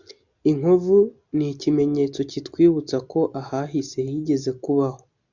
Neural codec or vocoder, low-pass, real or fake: none; 7.2 kHz; real